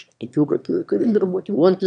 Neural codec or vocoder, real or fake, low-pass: autoencoder, 22.05 kHz, a latent of 192 numbers a frame, VITS, trained on one speaker; fake; 9.9 kHz